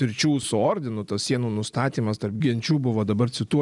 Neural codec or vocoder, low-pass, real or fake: none; 10.8 kHz; real